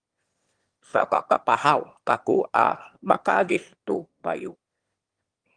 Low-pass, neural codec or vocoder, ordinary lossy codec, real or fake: 9.9 kHz; autoencoder, 22.05 kHz, a latent of 192 numbers a frame, VITS, trained on one speaker; Opus, 24 kbps; fake